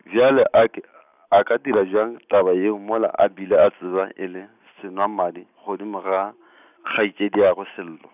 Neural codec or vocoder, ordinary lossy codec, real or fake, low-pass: none; none; real; 3.6 kHz